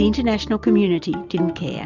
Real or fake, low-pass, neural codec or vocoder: real; 7.2 kHz; none